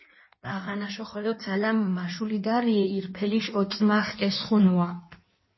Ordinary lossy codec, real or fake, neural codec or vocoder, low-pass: MP3, 24 kbps; fake; codec, 16 kHz in and 24 kHz out, 1.1 kbps, FireRedTTS-2 codec; 7.2 kHz